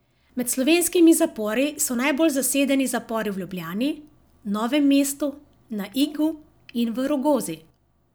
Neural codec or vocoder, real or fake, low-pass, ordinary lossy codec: none; real; none; none